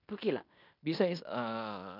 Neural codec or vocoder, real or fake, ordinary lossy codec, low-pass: codec, 16 kHz in and 24 kHz out, 0.9 kbps, LongCat-Audio-Codec, fine tuned four codebook decoder; fake; none; 5.4 kHz